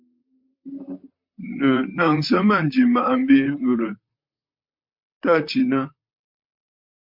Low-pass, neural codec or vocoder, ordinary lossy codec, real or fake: 5.4 kHz; vocoder, 44.1 kHz, 128 mel bands, Pupu-Vocoder; MP3, 48 kbps; fake